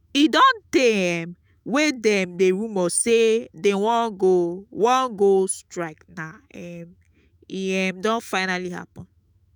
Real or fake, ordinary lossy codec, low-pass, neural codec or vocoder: fake; none; none; autoencoder, 48 kHz, 128 numbers a frame, DAC-VAE, trained on Japanese speech